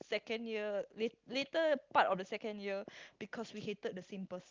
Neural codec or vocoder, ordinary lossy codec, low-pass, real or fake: none; Opus, 24 kbps; 7.2 kHz; real